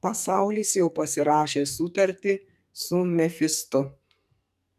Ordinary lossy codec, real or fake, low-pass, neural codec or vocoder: MP3, 96 kbps; fake; 14.4 kHz; codec, 44.1 kHz, 2.6 kbps, SNAC